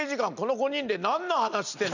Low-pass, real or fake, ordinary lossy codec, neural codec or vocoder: 7.2 kHz; real; none; none